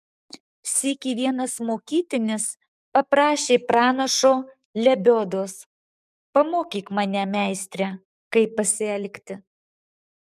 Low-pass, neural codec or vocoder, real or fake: 14.4 kHz; codec, 44.1 kHz, 7.8 kbps, DAC; fake